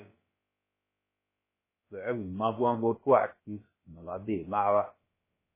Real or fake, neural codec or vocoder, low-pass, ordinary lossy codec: fake; codec, 16 kHz, about 1 kbps, DyCAST, with the encoder's durations; 3.6 kHz; MP3, 16 kbps